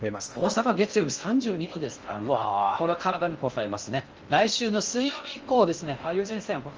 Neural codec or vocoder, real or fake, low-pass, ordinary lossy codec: codec, 16 kHz in and 24 kHz out, 0.6 kbps, FocalCodec, streaming, 2048 codes; fake; 7.2 kHz; Opus, 24 kbps